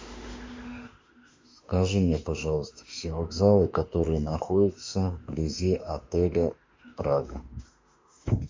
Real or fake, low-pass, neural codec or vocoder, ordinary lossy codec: fake; 7.2 kHz; autoencoder, 48 kHz, 32 numbers a frame, DAC-VAE, trained on Japanese speech; MP3, 64 kbps